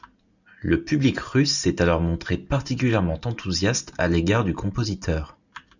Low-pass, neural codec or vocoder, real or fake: 7.2 kHz; none; real